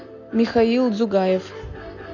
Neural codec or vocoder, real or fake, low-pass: none; real; 7.2 kHz